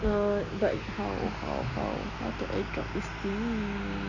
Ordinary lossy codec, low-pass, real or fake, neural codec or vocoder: none; 7.2 kHz; real; none